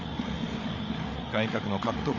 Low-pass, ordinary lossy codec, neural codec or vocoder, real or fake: 7.2 kHz; none; codec, 16 kHz, 8 kbps, FreqCodec, larger model; fake